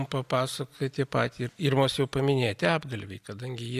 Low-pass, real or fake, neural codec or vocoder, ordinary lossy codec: 14.4 kHz; real; none; AAC, 96 kbps